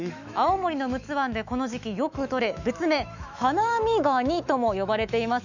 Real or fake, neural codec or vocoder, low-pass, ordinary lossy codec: fake; autoencoder, 48 kHz, 128 numbers a frame, DAC-VAE, trained on Japanese speech; 7.2 kHz; none